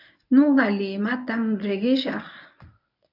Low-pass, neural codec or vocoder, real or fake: 5.4 kHz; codec, 24 kHz, 0.9 kbps, WavTokenizer, medium speech release version 1; fake